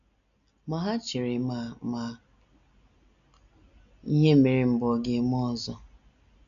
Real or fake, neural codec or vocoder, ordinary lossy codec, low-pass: real; none; none; 7.2 kHz